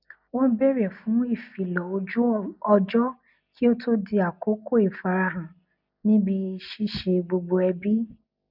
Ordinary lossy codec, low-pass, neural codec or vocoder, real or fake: none; 5.4 kHz; none; real